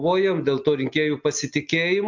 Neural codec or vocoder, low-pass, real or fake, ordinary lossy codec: none; 7.2 kHz; real; MP3, 64 kbps